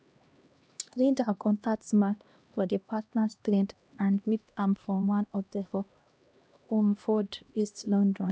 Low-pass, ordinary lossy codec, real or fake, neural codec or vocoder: none; none; fake; codec, 16 kHz, 1 kbps, X-Codec, HuBERT features, trained on LibriSpeech